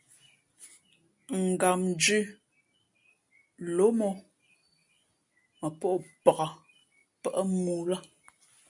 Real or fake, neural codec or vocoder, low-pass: real; none; 10.8 kHz